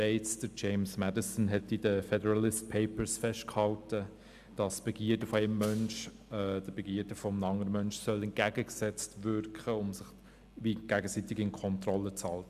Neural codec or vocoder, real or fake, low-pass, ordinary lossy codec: none; real; 14.4 kHz; AAC, 96 kbps